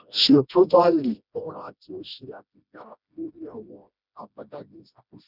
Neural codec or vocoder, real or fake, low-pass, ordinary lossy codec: codec, 16 kHz, 1 kbps, FreqCodec, smaller model; fake; 5.4 kHz; none